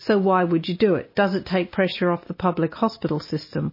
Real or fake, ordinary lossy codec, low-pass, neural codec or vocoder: real; MP3, 24 kbps; 5.4 kHz; none